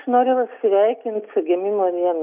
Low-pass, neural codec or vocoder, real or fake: 3.6 kHz; none; real